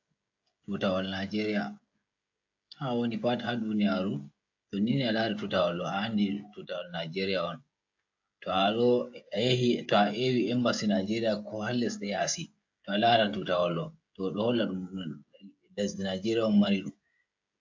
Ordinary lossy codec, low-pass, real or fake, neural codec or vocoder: AAC, 48 kbps; 7.2 kHz; fake; codec, 16 kHz, 16 kbps, FreqCodec, smaller model